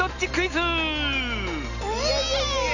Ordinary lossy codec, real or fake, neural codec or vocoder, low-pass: none; real; none; 7.2 kHz